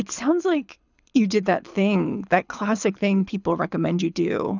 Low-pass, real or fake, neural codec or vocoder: 7.2 kHz; fake; codec, 24 kHz, 6 kbps, HILCodec